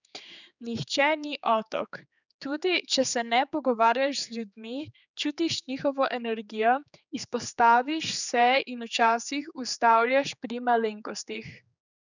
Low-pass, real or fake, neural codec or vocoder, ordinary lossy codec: 7.2 kHz; fake; codec, 16 kHz, 4 kbps, X-Codec, HuBERT features, trained on general audio; none